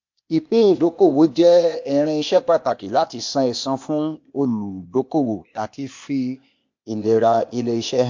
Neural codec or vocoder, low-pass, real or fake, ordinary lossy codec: codec, 16 kHz, 0.8 kbps, ZipCodec; 7.2 kHz; fake; MP3, 48 kbps